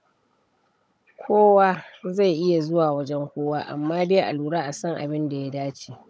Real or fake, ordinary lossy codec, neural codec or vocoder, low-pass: fake; none; codec, 16 kHz, 16 kbps, FunCodec, trained on Chinese and English, 50 frames a second; none